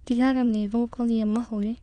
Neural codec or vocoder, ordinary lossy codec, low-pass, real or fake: autoencoder, 22.05 kHz, a latent of 192 numbers a frame, VITS, trained on many speakers; Opus, 64 kbps; 9.9 kHz; fake